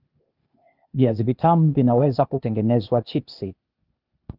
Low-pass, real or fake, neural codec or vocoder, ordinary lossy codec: 5.4 kHz; fake; codec, 16 kHz, 0.8 kbps, ZipCodec; Opus, 32 kbps